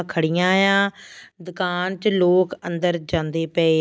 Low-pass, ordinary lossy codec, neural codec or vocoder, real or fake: none; none; none; real